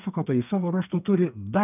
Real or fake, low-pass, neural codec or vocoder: fake; 3.6 kHz; codec, 32 kHz, 1.9 kbps, SNAC